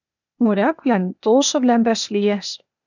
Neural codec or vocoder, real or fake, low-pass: codec, 16 kHz, 0.8 kbps, ZipCodec; fake; 7.2 kHz